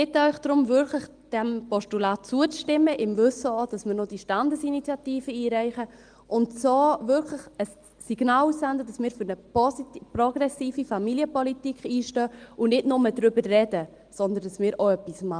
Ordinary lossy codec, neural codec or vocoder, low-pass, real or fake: Opus, 24 kbps; none; 9.9 kHz; real